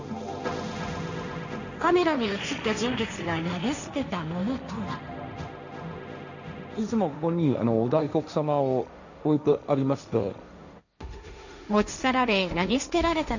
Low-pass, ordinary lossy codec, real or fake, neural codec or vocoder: 7.2 kHz; none; fake; codec, 16 kHz, 1.1 kbps, Voila-Tokenizer